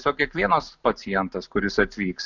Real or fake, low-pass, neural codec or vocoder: real; 7.2 kHz; none